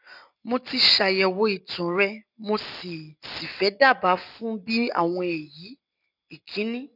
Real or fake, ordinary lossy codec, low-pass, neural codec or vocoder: real; none; 5.4 kHz; none